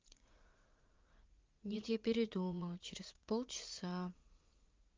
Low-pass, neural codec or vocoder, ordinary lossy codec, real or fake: 7.2 kHz; vocoder, 44.1 kHz, 80 mel bands, Vocos; Opus, 32 kbps; fake